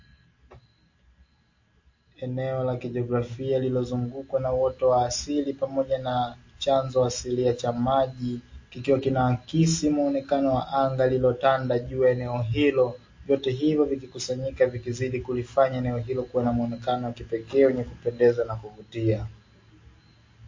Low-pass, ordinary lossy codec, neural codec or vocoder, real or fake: 7.2 kHz; MP3, 32 kbps; none; real